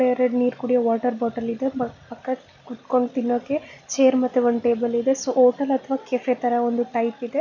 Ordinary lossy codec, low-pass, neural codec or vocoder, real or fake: none; 7.2 kHz; none; real